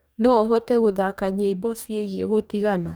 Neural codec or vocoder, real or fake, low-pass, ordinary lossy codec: codec, 44.1 kHz, 2.6 kbps, DAC; fake; none; none